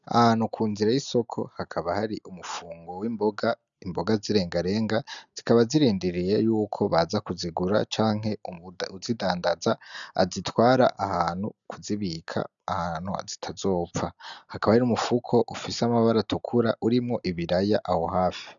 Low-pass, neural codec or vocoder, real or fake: 7.2 kHz; none; real